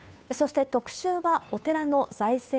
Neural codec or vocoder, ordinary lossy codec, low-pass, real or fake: codec, 16 kHz, 2 kbps, FunCodec, trained on Chinese and English, 25 frames a second; none; none; fake